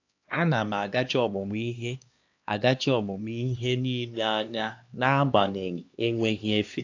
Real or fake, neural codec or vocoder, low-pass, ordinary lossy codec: fake; codec, 16 kHz, 2 kbps, X-Codec, HuBERT features, trained on LibriSpeech; 7.2 kHz; AAC, 48 kbps